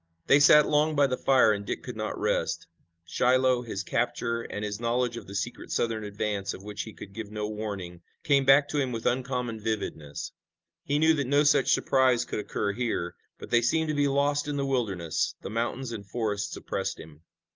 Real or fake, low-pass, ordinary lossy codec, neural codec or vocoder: real; 7.2 kHz; Opus, 24 kbps; none